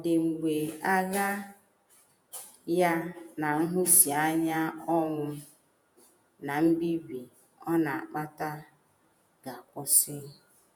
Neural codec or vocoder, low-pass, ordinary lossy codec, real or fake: vocoder, 48 kHz, 128 mel bands, Vocos; none; none; fake